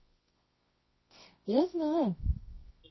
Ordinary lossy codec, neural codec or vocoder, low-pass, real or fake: MP3, 24 kbps; codec, 24 kHz, 0.9 kbps, WavTokenizer, medium music audio release; 7.2 kHz; fake